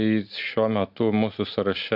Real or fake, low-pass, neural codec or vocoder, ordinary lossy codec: real; 5.4 kHz; none; AAC, 48 kbps